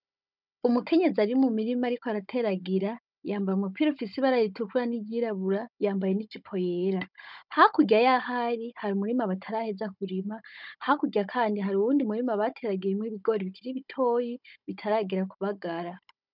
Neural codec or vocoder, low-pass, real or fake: codec, 16 kHz, 16 kbps, FunCodec, trained on Chinese and English, 50 frames a second; 5.4 kHz; fake